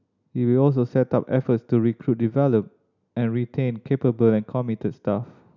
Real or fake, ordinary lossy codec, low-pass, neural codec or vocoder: real; none; 7.2 kHz; none